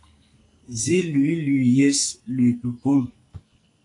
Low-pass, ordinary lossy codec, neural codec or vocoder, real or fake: 10.8 kHz; AAC, 48 kbps; codec, 44.1 kHz, 2.6 kbps, SNAC; fake